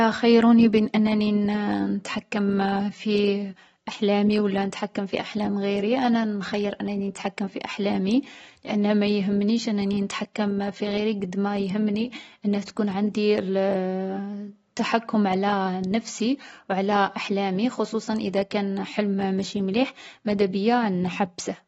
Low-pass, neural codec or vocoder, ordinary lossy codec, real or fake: 19.8 kHz; none; AAC, 24 kbps; real